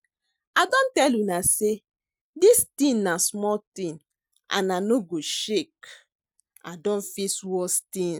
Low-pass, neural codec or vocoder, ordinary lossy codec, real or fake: none; none; none; real